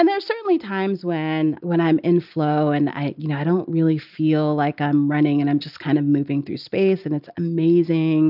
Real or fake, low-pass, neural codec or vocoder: real; 5.4 kHz; none